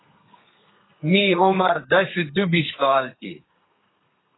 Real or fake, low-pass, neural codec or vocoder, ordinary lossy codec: fake; 7.2 kHz; codec, 32 kHz, 1.9 kbps, SNAC; AAC, 16 kbps